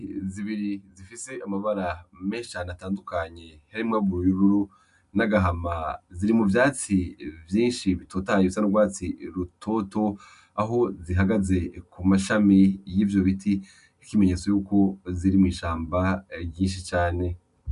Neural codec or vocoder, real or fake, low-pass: none; real; 10.8 kHz